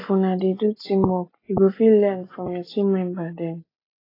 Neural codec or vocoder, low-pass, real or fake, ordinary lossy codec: none; 5.4 kHz; real; AAC, 24 kbps